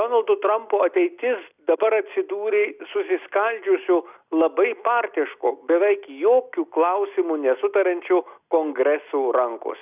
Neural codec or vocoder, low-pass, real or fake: none; 3.6 kHz; real